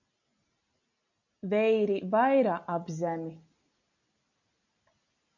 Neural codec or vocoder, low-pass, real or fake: none; 7.2 kHz; real